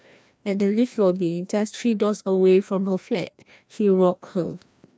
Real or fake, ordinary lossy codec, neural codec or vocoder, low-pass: fake; none; codec, 16 kHz, 1 kbps, FreqCodec, larger model; none